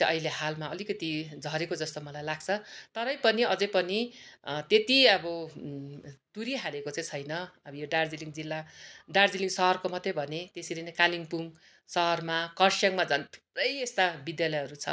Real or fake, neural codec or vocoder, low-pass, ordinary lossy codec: real; none; none; none